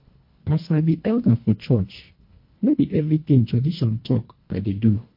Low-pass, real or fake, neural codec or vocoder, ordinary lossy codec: 5.4 kHz; fake; codec, 24 kHz, 1.5 kbps, HILCodec; MP3, 32 kbps